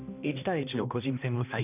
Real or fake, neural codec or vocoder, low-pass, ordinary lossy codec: fake; codec, 16 kHz, 1 kbps, X-Codec, HuBERT features, trained on balanced general audio; 3.6 kHz; none